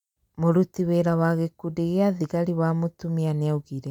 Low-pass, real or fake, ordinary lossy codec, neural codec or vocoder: 19.8 kHz; real; none; none